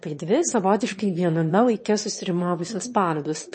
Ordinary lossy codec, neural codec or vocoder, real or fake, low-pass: MP3, 32 kbps; autoencoder, 22.05 kHz, a latent of 192 numbers a frame, VITS, trained on one speaker; fake; 9.9 kHz